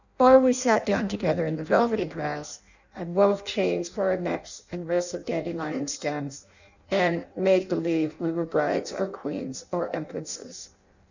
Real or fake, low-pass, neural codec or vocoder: fake; 7.2 kHz; codec, 16 kHz in and 24 kHz out, 0.6 kbps, FireRedTTS-2 codec